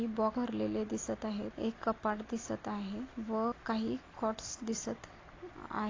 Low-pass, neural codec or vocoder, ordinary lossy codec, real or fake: 7.2 kHz; none; AAC, 32 kbps; real